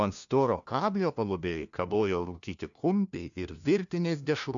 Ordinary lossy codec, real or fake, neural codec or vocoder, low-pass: MP3, 96 kbps; fake; codec, 16 kHz, 1 kbps, FunCodec, trained on LibriTTS, 50 frames a second; 7.2 kHz